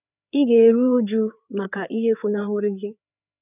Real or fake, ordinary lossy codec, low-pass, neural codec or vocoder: fake; none; 3.6 kHz; codec, 16 kHz, 4 kbps, FreqCodec, larger model